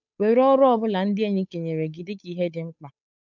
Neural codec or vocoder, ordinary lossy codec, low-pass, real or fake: codec, 16 kHz, 8 kbps, FunCodec, trained on Chinese and English, 25 frames a second; none; 7.2 kHz; fake